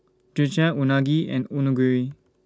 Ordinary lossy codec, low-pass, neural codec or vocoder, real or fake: none; none; none; real